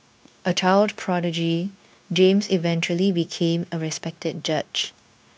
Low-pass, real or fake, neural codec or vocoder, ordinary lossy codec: none; fake; codec, 16 kHz, 0.9 kbps, LongCat-Audio-Codec; none